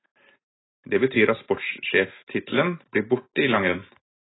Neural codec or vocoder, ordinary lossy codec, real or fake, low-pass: none; AAC, 16 kbps; real; 7.2 kHz